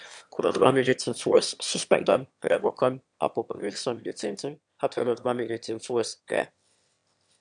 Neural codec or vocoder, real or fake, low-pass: autoencoder, 22.05 kHz, a latent of 192 numbers a frame, VITS, trained on one speaker; fake; 9.9 kHz